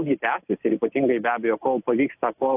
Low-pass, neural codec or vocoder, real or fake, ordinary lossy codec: 3.6 kHz; none; real; AAC, 32 kbps